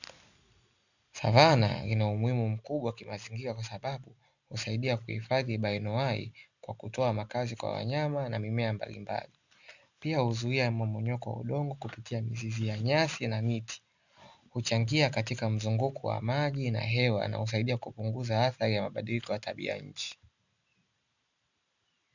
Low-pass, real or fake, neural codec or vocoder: 7.2 kHz; real; none